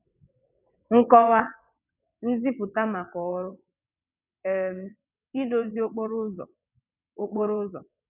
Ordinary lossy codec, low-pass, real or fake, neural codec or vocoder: none; 3.6 kHz; fake; vocoder, 22.05 kHz, 80 mel bands, WaveNeXt